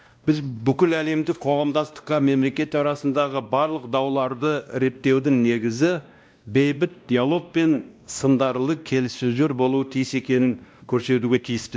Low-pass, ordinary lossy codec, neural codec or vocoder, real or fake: none; none; codec, 16 kHz, 1 kbps, X-Codec, WavLM features, trained on Multilingual LibriSpeech; fake